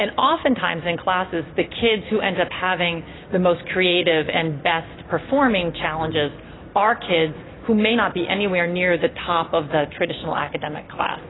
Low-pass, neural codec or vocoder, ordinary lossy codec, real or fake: 7.2 kHz; none; AAC, 16 kbps; real